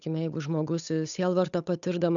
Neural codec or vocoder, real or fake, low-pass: codec, 16 kHz, 8 kbps, FunCodec, trained on Chinese and English, 25 frames a second; fake; 7.2 kHz